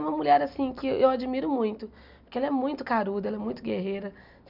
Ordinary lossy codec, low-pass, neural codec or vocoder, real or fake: none; 5.4 kHz; none; real